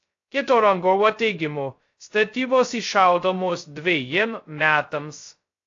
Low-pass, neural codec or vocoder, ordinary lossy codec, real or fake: 7.2 kHz; codec, 16 kHz, 0.2 kbps, FocalCodec; AAC, 48 kbps; fake